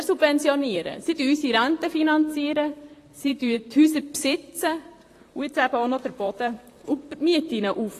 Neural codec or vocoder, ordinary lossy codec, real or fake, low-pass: vocoder, 44.1 kHz, 128 mel bands, Pupu-Vocoder; AAC, 48 kbps; fake; 14.4 kHz